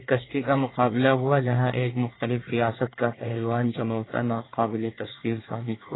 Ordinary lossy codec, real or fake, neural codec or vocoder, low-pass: AAC, 16 kbps; fake; codec, 24 kHz, 1 kbps, SNAC; 7.2 kHz